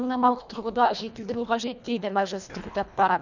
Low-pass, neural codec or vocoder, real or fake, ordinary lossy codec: 7.2 kHz; codec, 24 kHz, 1.5 kbps, HILCodec; fake; none